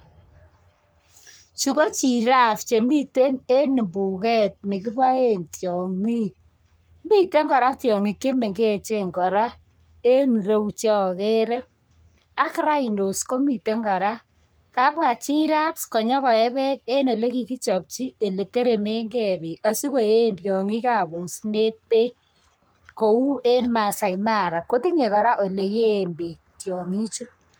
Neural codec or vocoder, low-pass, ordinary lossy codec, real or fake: codec, 44.1 kHz, 3.4 kbps, Pupu-Codec; none; none; fake